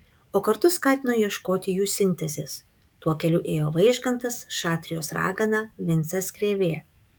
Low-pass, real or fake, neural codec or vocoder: 19.8 kHz; fake; codec, 44.1 kHz, 7.8 kbps, DAC